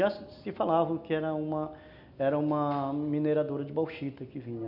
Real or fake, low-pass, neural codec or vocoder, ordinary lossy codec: real; 5.4 kHz; none; none